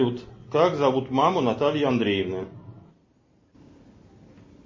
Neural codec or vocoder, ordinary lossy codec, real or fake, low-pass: none; MP3, 32 kbps; real; 7.2 kHz